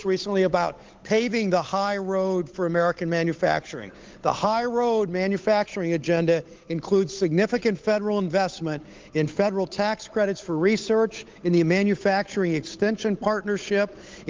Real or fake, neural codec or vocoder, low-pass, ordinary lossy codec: fake; codec, 16 kHz, 8 kbps, FunCodec, trained on Chinese and English, 25 frames a second; 7.2 kHz; Opus, 32 kbps